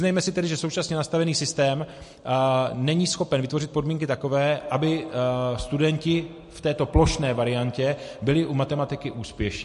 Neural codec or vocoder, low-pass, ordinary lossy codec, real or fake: none; 14.4 kHz; MP3, 48 kbps; real